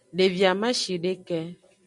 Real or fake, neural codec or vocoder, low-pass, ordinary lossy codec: real; none; 10.8 kHz; MP3, 96 kbps